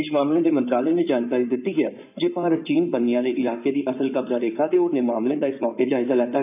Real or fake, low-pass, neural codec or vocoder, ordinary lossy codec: fake; 3.6 kHz; codec, 16 kHz in and 24 kHz out, 2.2 kbps, FireRedTTS-2 codec; none